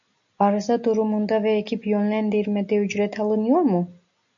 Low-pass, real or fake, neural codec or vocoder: 7.2 kHz; real; none